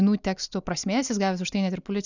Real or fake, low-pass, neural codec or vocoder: real; 7.2 kHz; none